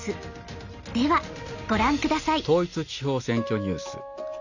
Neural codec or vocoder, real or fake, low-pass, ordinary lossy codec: none; real; 7.2 kHz; none